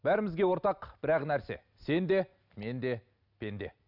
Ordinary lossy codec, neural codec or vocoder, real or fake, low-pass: none; none; real; 5.4 kHz